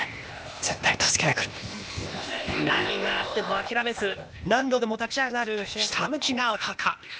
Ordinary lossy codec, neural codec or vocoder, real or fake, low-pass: none; codec, 16 kHz, 0.8 kbps, ZipCodec; fake; none